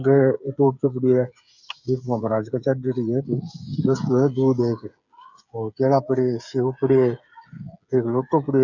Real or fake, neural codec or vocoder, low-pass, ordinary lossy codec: fake; codec, 16 kHz, 8 kbps, FreqCodec, smaller model; 7.2 kHz; none